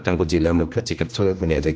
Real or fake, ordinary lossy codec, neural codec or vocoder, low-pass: fake; none; codec, 16 kHz, 1 kbps, X-Codec, HuBERT features, trained on general audio; none